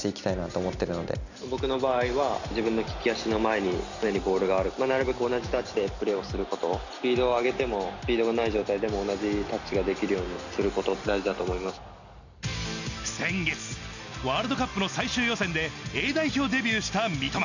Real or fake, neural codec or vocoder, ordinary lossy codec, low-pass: real; none; none; 7.2 kHz